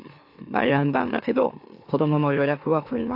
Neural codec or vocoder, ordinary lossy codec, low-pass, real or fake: autoencoder, 44.1 kHz, a latent of 192 numbers a frame, MeloTTS; AAC, 32 kbps; 5.4 kHz; fake